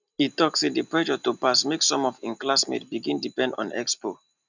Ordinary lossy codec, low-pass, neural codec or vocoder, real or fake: none; 7.2 kHz; none; real